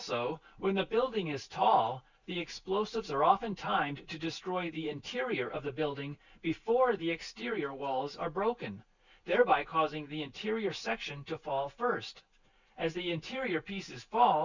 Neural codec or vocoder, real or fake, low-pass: none; real; 7.2 kHz